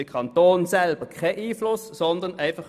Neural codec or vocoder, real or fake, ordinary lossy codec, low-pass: none; real; none; 14.4 kHz